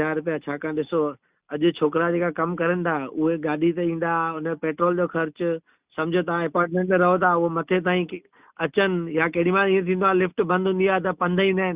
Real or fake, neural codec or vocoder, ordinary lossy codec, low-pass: real; none; Opus, 64 kbps; 3.6 kHz